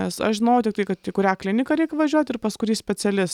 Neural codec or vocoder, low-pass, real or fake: none; 19.8 kHz; real